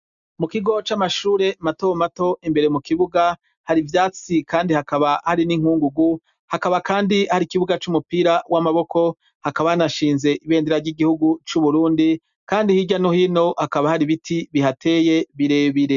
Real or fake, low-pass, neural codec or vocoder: real; 7.2 kHz; none